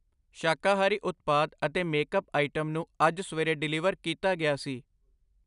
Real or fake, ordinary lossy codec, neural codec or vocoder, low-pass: real; none; none; 10.8 kHz